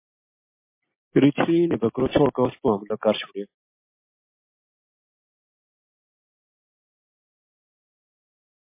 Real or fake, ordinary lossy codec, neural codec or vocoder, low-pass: real; MP3, 24 kbps; none; 3.6 kHz